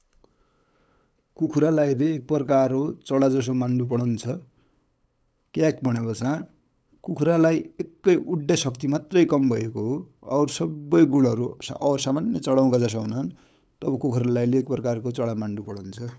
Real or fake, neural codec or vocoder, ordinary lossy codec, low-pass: fake; codec, 16 kHz, 8 kbps, FunCodec, trained on LibriTTS, 25 frames a second; none; none